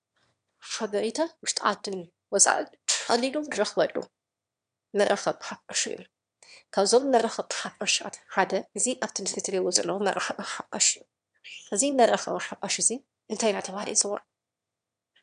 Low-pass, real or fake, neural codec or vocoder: 9.9 kHz; fake; autoencoder, 22.05 kHz, a latent of 192 numbers a frame, VITS, trained on one speaker